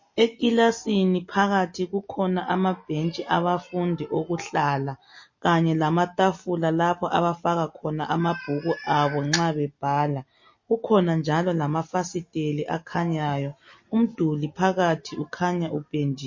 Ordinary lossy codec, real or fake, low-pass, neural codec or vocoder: MP3, 32 kbps; real; 7.2 kHz; none